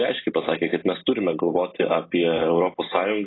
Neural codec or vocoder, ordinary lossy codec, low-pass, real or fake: none; AAC, 16 kbps; 7.2 kHz; real